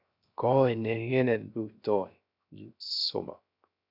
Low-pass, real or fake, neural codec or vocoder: 5.4 kHz; fake; codec, 16 kHz, 0.3 kbps, FocalCodec